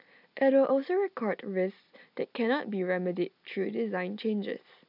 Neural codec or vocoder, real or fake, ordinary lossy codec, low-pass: none; real; none; 5.4 kHz